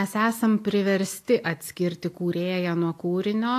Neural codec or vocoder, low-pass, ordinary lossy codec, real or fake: none; 14.4 kHz; AAC, 64 kbps; real